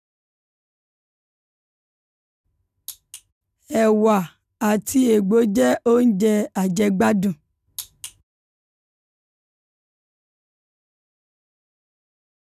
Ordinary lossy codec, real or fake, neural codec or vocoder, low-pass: none; real; none; 14.4 kHz